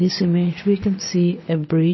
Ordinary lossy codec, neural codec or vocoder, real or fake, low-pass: MP3, 24 kbps; vocoder, 22.05 kHz, 80 mel bands, WaveNeXt; fake; 7.2 kHz